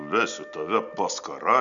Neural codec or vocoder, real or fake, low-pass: none; real; 7.2 kHz